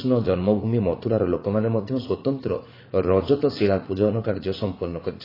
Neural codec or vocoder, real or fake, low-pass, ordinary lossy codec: vocoder, 44.1 kHz, 80 mel bands, Vocos; fake; 5.4 kHz; AAC, 24 kbps